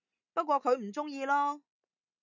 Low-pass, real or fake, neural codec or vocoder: 7.2 kHz; real; none